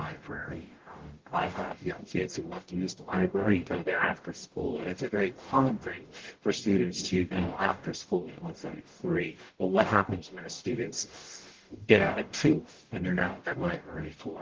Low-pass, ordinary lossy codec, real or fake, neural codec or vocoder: 7.2 kHz; Opus, 16 kbps; fake; codec, 44.1 kHz, 0.9 kbps, DAC